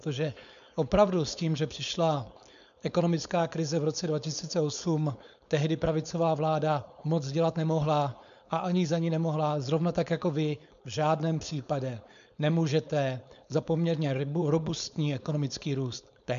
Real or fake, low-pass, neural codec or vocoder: fake; 7.2 kHz; codec, 16 kHz, 4.8 kbps, FACodec